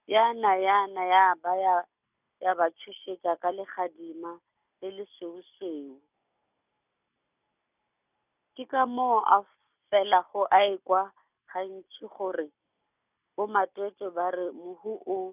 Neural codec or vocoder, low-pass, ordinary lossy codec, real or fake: none; 3.6 kHz; none; real